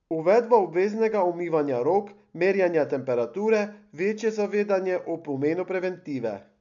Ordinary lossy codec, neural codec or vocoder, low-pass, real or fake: none; none; 7.2 kHz; real